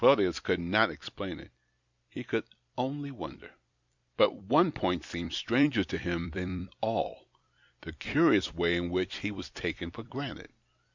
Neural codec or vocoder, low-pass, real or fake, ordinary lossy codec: vocoder, 44.1 kHz, 128 mel bands every 256 samples, BigVGAN v2; 7.2 kHz; fake; Opus, 64 kbps